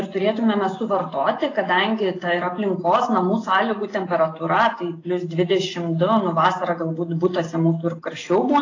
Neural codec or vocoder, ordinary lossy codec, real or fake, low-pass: none; AAC, 32 kbps; real; 7.2 kHz